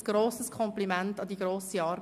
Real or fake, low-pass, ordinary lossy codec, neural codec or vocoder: real; 14.4 kHz; none; none